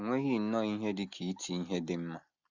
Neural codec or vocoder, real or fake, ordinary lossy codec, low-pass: none; real; none; 7.2 kHz